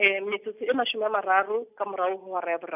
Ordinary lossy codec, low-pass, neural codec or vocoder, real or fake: none; 3.6 kHz; none; real